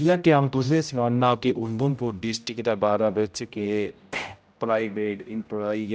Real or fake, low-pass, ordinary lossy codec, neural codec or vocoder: fake; none; none; codec, 16 kHz, 0.5 kbps, X-Codec, HuBERT features, trained on general audio